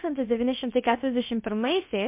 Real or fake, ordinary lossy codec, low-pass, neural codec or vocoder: fake; MP3, 32 kbps; 3.6 kHz; codec, 16 kHz, about 1 kbps, DyCAST, with the encoder's durations